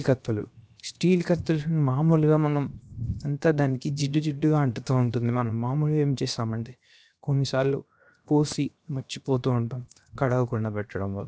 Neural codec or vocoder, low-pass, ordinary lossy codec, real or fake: codec, 16 kHz, 0.7 kbps, FocalCodec; none; none; fake